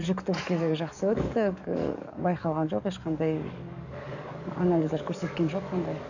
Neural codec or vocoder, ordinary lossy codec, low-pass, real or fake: codec, 44.1 kHz, 7.8 kbps, DAC; none; 7.2 kHz; fake